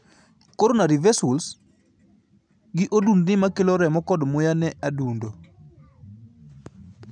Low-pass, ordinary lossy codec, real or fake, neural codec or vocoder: 9.9 kHz; none; real; none